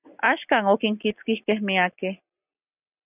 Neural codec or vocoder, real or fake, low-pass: none; real; 3.6 kHz